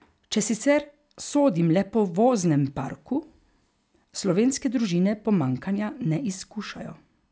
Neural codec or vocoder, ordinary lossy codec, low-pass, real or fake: none; none; none; real